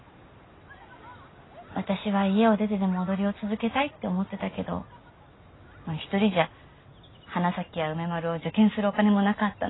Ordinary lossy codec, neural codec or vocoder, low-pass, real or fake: AAC, 16 kbps; none; 7.2 kHz; real